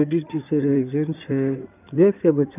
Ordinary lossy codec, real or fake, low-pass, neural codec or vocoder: none; fake; 3.6 kHz; codec, 16 kHz in and 24 kHz out, 2.2 kbps, FireRedTTS-2 codec